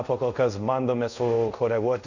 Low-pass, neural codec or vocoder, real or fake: 7.2 kHz; codec, 24 kHz, 0.5 kbps, DualCodec; fake